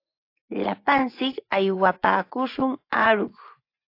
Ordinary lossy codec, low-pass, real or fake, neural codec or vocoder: AAC, 32 kbps; 5.4 kHz; fake; vocoder, 24 kHz, 100 mel bands, Vocos